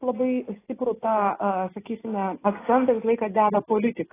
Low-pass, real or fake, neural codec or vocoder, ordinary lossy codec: 3.6 kHz; real; none; AAC, 16 kbps